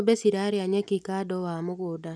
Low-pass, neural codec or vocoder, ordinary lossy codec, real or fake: none; none; none; real